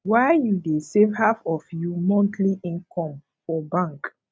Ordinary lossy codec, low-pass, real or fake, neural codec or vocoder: none; none; real; none